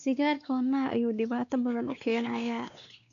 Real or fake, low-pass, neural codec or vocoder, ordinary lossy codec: fake; 7.2 kHz; codec, 16 kHz, 2 kbps, X-Codec, WavLM features, trained on Multilingual LibriSpeech; none